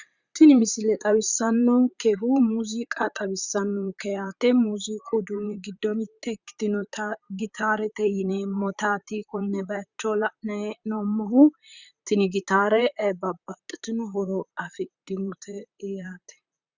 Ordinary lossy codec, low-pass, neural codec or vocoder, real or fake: Opus, 64 kbps; 7.2 kHz; vocoder, 44.1 kHz, 128 mel bands, Pupu-Vocoder; fake